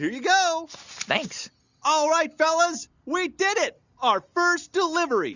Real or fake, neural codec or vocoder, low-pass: real; none; 7.2 kHz